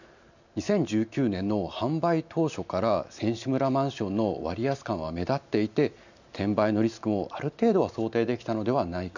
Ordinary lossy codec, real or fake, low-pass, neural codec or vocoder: none; real; 7.2 kHz; none